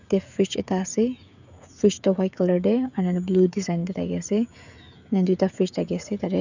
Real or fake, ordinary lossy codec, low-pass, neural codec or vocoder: fake; none; 7.2 kHz; codec, 16 kHz, 16 kbps, FreqCodec, smaller model